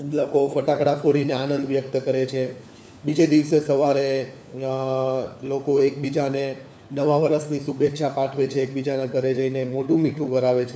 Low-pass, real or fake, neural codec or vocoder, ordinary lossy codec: none; fake; codec, 16 kHz, 4 kbps, FunCodec, trained on LibriTTS, 50 frames a second; none